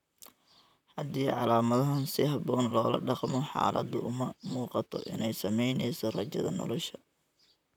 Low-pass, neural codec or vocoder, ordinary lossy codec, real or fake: 19.8 kHz; vocoder, 44.1 kHz, 128 mel bands, Pupu-Vocoder; none; fake